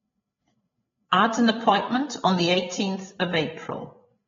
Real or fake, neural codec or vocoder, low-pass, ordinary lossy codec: fake; codec, 16 kHz, 8 kbps, FreqCodec, larger model; 7.2 kHz; AAC, 24 kbps